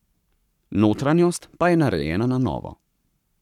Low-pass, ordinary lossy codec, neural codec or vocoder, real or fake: 19.8 kHz; none; codec, 44.1 kHz, 7.8 kbps, Pupu-Codec; fake